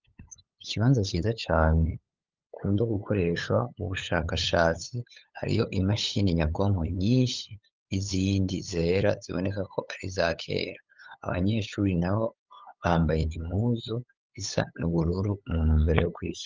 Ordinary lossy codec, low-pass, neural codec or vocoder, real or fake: Opus, 32 kbps; 7.2 kHz; codec, 16 kHz, 8 kbps, FunCodec, trained on LibriTTS, 25 frames a second; fake